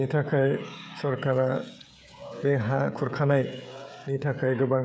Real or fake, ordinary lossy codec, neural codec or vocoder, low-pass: fake; none; codec, 16 kHz, 16 kbps, FreqCodec, smaller model; none